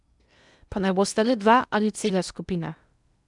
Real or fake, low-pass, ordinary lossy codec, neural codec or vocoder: fake; 10.8 kHz; none; codec, 16 kHz in and 24 kHz out, 0.8 kbps, FocalCodec, streaming, 65536 codes